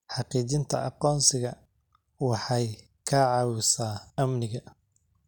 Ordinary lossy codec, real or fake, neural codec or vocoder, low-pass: none; real; none; 19.8 kHz